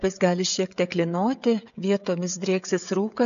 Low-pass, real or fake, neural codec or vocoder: 7.2 kHz; fake; codec, 16 kHz, 16 kbps, FreqCodec, smaller model